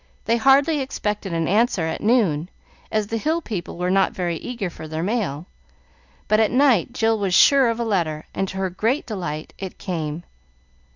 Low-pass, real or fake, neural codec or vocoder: 7.2 kHz; real; none